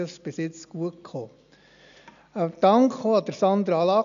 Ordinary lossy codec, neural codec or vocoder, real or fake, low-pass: none; none; real; 7.2 kHz